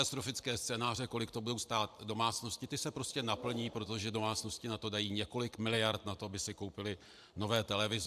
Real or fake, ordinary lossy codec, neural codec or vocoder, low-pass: fake; AAC, 96 kbps; vocoder, 44.1 kHz, 128 mel bands every 512 samples, BigVGAN v2; 14.4 kHz